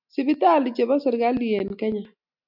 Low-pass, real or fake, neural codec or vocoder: 5.4 kHz; real; none